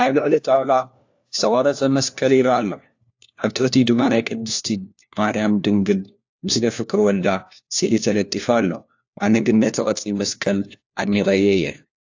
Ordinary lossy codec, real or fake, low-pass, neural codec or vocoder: AAC, 48 kbps; fake; 7.2 kHz; codec, 16 kHz, 1 kbps, FunCodec, trained on LibriTTS, 50 frames a second